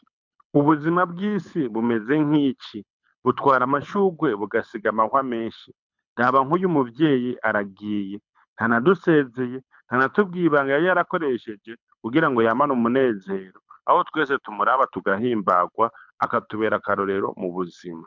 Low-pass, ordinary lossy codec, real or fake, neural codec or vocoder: 7.2 kHz; MP3, 64 kbps; fake; codec, 24 kHz, 6 kbps, HILCodec